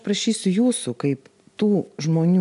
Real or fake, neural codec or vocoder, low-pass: real; none; 10.8 kHz